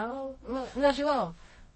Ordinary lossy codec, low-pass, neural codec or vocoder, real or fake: MP3, 32 kbps; 10.8 kHz; codec, 24 kHz, 0.9 kbps, WavTokenizer, medium music audio release; fake